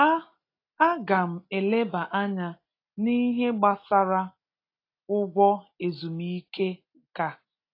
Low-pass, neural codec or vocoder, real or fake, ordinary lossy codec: 5.4 kHz; none; real; AAC, 32 kbps